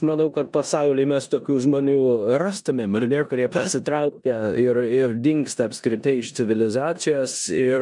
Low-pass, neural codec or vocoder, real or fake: 10.8 kHz; codec, 16 kHz in and 24 kHz out, 0.9 kbps, LongCat-Audio-Codec, four codebook decoder; fake